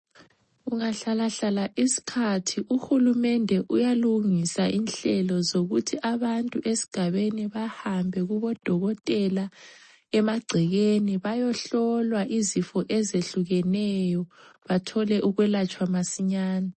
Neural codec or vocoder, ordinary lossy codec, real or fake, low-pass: none; MP3, 32 kbps; real; 10.8 kHz